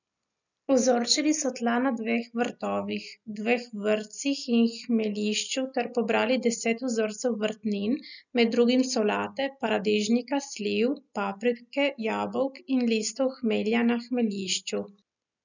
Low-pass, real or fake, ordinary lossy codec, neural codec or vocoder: 7.2 kHz; real; none; none